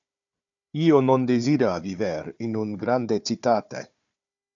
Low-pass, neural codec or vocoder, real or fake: 7.2 kHz; codec, 16 kHz, 4 kbps, FunCodec, trained on Chinese and English, 50 frames a second; fake